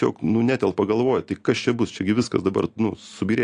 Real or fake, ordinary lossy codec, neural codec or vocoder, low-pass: real; AAC, 48 kbps; none; 9.9 kHz